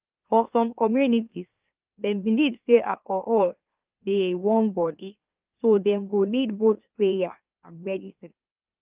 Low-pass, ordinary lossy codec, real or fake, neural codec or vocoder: 3.6 kHz; Opus, 24 kbps; fake; autoencoder, 44.1 kHz, a latent of 192 numbers a frame, MeloTTS